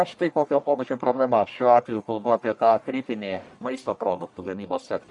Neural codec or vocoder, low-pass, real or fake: codec, 44.1 kHz, 1.7 kbps, Pupu-Codec; 10.8 kHz; fake